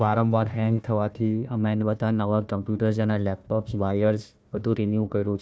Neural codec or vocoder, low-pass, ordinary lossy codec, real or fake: codec, 16 kHz, 1 kbps, FunCodec, trained on Chinese and English, 50 frames a second; none; none; fake